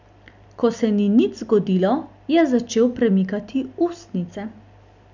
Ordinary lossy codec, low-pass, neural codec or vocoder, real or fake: none; 7.2 kHz; none; real